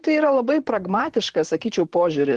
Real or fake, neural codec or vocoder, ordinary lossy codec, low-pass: fake; vocoder, 44.1 kHz, 128 mel bands, Pupu-Vocoder; Opus, 16 kbps; 10.8 kHz